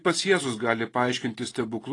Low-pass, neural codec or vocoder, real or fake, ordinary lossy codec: 10.8 kHz; none; real; AAC, 32 kbps